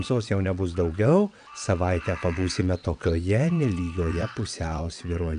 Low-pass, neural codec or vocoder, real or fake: 9.9 kHz; vocoder, 22.05 kHz, 80 mel bands, WaveNeXt; fake